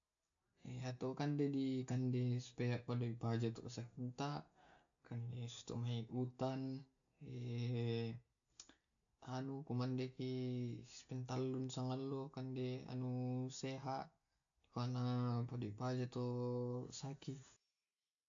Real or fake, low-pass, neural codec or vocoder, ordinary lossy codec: real; 7.2 kHz; none; AAC, 64 kbps